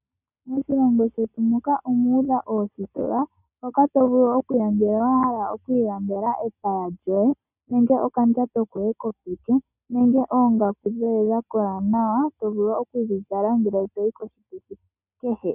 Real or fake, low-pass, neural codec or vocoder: real; 3.6 kHz; none